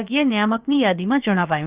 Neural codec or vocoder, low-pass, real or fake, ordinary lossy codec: codec, 16 kHz, about 1 kbps, DyCAST, with the encoder's durations; 3.6 kHz; fake; Opus, 24 kbps